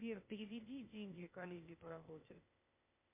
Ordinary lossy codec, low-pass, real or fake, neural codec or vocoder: MP3, 32 kbps; 3.6 kHz; fake; codec, 16 kHz, 0.8 kbps, ZipCodec